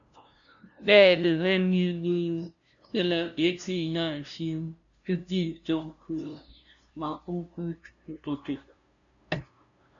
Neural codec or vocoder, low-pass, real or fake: codec, 16 kHz, 0.5 kbps, FunCodec, trained on LibriTTS, 25 frames a second; 7.2 kHz; fake